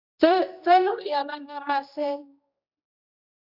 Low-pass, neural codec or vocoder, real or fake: 5.4 kHz; codec, 16 kHz, 1 kbps, X-Codec, HuBERT features, trained on general audio; fake